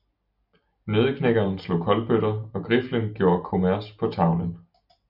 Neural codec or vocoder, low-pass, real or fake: none; 5.4 kHz; real